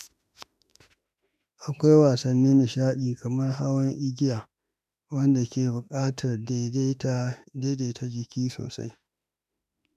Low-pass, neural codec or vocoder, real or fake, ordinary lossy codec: 14.4 kHz; autoencoder, 48 kHz, 32 numbers a frame, DAC-VAE, trained on Japanese speech; fake; none